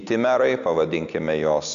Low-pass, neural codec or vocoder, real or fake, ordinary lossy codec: 7.2 kHz; none; real; AAC, 96 kbps